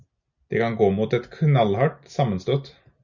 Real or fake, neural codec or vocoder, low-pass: real; none; 7.2 kHz